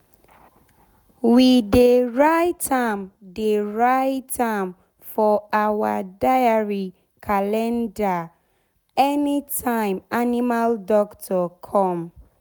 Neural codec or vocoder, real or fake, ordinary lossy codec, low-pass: none; real; none; none